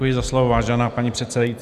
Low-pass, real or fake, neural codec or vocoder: 14.4 kHz; real; none